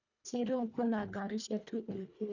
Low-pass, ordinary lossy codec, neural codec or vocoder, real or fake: 7.2 kHz; none; codec, 24 kHz, 1.5 kbps, HILCodec; fake